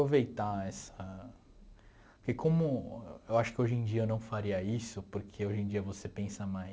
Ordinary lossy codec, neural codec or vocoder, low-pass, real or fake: none; none; none; real